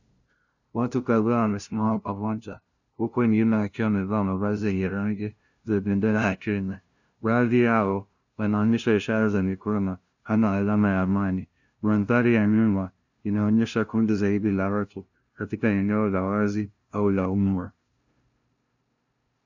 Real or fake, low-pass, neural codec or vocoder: fake; 7.2 kHz; codec, 16 kHz, 0.5 kbps, FunCodec, trained on LibriTTS, 25 frames a second